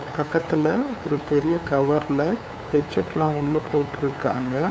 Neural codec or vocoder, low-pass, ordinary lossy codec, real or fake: codec, 16 kHz, 2 kbps, FunCodec, trained on LibriTTS, 25 frames a second; none; none; fake